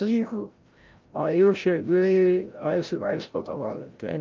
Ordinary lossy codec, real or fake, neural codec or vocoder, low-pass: Opus, 24 kbps; fake; codec, 16 kHz, 0.5 kbps, FreqCodec, larger model; 7.2 kHz